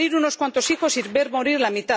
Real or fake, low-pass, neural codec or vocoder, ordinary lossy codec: real; none; none; none